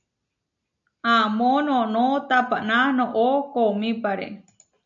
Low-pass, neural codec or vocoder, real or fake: 7.2 kHz; none; real